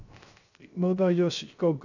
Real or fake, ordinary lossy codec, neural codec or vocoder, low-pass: fake; none; codec, 16 kHz, 0.3 kbps, FocalCodec; 7.2 kHz